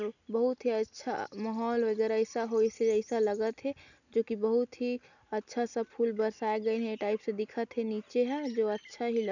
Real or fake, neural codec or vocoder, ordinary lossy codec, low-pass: real; none; none; 7.2 kHz